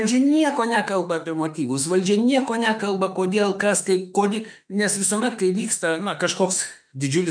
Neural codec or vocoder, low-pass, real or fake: autoencoder, 48 kHz, 32 numbers a frame, DAC-VAE, trained on Japanese speech; 9.9 kHz; fake